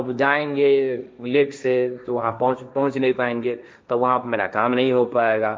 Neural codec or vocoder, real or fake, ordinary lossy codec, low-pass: codec, 16 kHz, 1.1 kbps, Voila-Tokenizer; fake; none; none